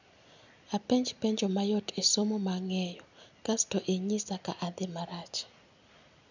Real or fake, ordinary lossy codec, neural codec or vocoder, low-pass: real; none; none; 7.2 kHz